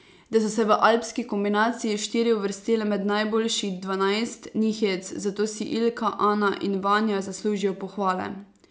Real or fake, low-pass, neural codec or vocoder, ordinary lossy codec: real; none; none; none